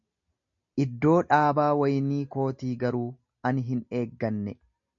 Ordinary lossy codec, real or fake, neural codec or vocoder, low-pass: MP3, 96 kbps; real; none; 7.2 kHz